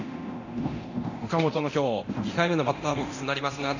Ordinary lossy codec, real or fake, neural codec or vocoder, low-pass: none; fake; codec, 24 kHz, 0.9 kbps, DualCodec; 7.2 kHz